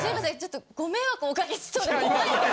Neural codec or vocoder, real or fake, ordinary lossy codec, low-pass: none; real; none; none